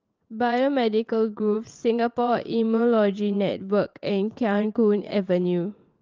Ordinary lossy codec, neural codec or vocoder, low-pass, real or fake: Opus, 32 kbps; vocoder, 22.05 kHz, 80 mel bands, WaveNeXt; 7.2 kHz; fake